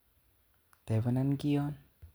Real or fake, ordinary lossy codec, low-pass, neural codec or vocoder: real; none; none; none